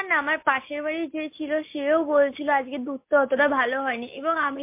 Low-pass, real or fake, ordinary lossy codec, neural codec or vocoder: 3.6 kHz; real; MP3, 24 kbps; none